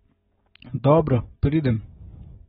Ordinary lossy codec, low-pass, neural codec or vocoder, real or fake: AAC, 16 kbps; 10.8 kHz; none; real